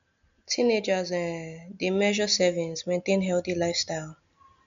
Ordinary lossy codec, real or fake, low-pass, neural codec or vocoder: MP3, 64 kbps; real; 7.2 kHz; none